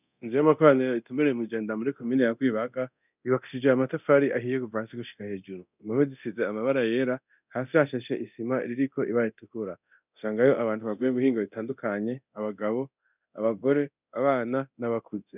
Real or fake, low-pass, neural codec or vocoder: fake; 3.6 kHz; codec, 24 kHz, 0.9 kbps, DualCodec